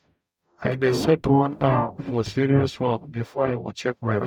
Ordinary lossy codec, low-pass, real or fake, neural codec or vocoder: none; 19.8 kHz; fake; codec, 44.1 kHz, 0.9 kbps, DAC